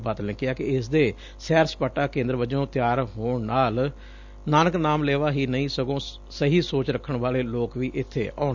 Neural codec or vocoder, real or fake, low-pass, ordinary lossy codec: none; real; 7.2 kHz; none